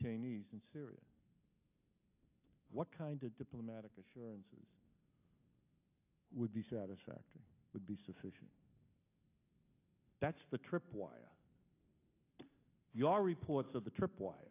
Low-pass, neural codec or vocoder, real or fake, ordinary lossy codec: 3.6 kHz; none; real; AAC, 24 kbps